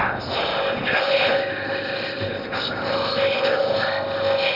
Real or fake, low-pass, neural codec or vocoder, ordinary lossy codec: fake; 5.4 kHz; codec, 16 kHz in and 24 kHz out, 0.8 kbps, FocalCodec, streaming, 65536 codes; none